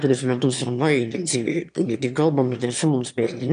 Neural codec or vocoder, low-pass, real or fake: autoencoder, 22.05 kHz, a latent of 192 numbers a frame, VITS, trained on one speaker; 9.9 kHz; fake